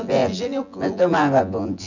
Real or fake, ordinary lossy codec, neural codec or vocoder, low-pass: fake; none; vocoder, 24 kHz, 100 mel bands, Vocos; 7.2 kHz